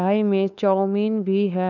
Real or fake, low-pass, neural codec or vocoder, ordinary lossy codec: fake; 7.2 kHz; codec, 16 kHz, 2 kbps, FunCodec, trained on LibriTTS, 25 frames a second; none